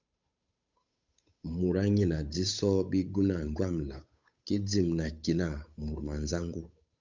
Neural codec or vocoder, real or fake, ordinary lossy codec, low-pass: codec, 16 kHz, 8 kbps, FunCodec, trained on Chinese and English, 25 frames a second; fake; MP3, 64 kbps; 7.2 kHz